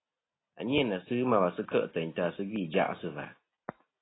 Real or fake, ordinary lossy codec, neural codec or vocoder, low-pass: real; AAC, 16 kbps; none; 7.2 kHz